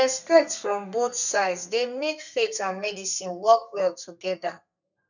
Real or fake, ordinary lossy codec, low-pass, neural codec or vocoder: fake; none; 7.2 kHz; codec, 32 kHz, 1.9 kbps, SNAC